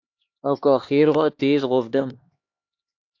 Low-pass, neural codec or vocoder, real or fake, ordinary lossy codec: 7.2 kHz; codec, 16 kHz, 2 kbps, X-Codec, HuBERT features, trained on LibriSpeech; fake; AAC, 48 kbps